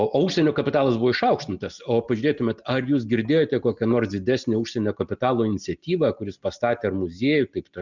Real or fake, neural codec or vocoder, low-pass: real; none; 7.2 kHz